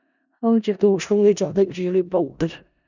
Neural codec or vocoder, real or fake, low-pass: codec, 16 kHz in and 24 kHz out, 0.4 kbps, LongCat-Audio-Codec, four codebook decoder; fake; 7.2 kHz